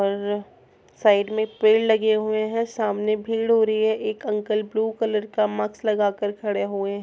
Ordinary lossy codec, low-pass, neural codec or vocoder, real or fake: none; none; none; real